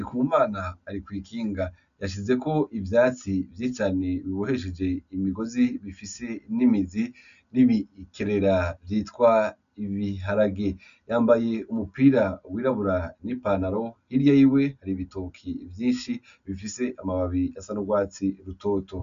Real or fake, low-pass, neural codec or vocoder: real; 7.2 kHz; none